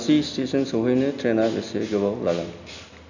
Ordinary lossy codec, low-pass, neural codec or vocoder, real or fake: none; 7.2 kHz; none; real